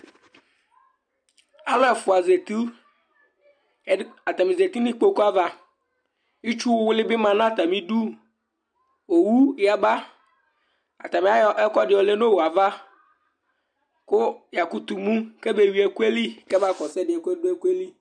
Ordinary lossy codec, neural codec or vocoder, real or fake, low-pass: MP3, 96 kbps; none; real; 9.9 kHz